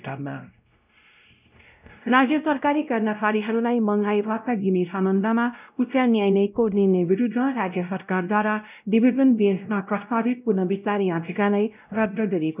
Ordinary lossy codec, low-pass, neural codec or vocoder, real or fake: none; 3.6 kHz; codec, 16 kHz, 0.5 kbps, X-Codec, WavLM features, trained on Multilingual LibriSpeech; fake